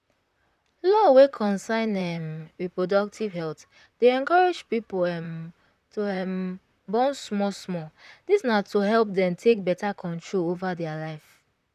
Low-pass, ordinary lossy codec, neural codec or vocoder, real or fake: 14.4 kHz; none; vocoder, 44.1 kHz, 128 mel bands, Pupu-Vocoder; fake